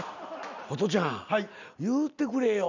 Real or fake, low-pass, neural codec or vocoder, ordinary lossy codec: real; 7.2 kHz; none; none